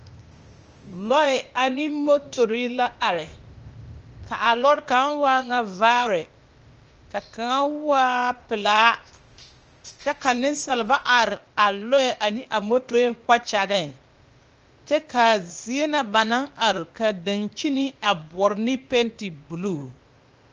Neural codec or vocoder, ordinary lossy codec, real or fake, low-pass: codec, 16 kHz, 0.8 kbps, ZipCodec; Opus, 24 kbps; fake; 7.2 kHz